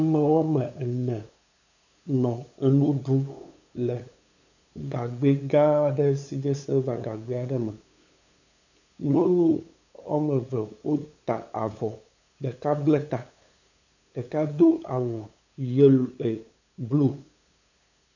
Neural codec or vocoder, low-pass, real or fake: codec, 16 kHz, 8 kbps, FunCodec, trained on LibriTTS, 25 frames a second; 7.2 kHz; fake